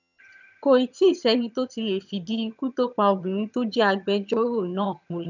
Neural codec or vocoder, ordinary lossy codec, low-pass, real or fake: vocoder, 22.05 kHz, 80 mel bands, HiFi-GAN; none; 7.2 kHz; fake